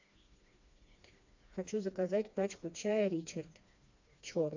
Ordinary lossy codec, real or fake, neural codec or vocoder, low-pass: AAC, 48 kbps; fake; codec, 16 kHz, 2 kbps, FreqCodec, smaller model; 7.2 kHz